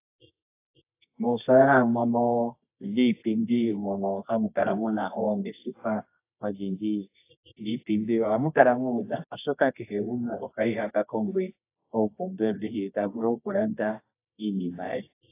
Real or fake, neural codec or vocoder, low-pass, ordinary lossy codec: fake; codec, 24 kHz, 0.9 kbps, WavTokenizer, medium music audio release; 3.6 kHz; AAC, 24 kbps